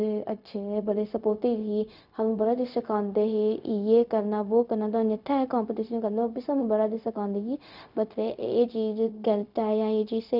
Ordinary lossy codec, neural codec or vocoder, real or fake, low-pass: none; codec, 16 kHz, 0.4 kbps, LongCat-Audio-Codec; fake; 5.4 kHz